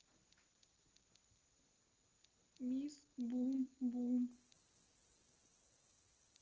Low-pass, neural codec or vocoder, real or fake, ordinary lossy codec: 7.2 kHz; none; real; Opus, 16 kbps